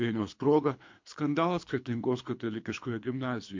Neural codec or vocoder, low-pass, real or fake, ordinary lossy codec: codec, 24 kHz, 3 kbps, HILCodec; 7.2 kHz; fake; MP3, 48 kbps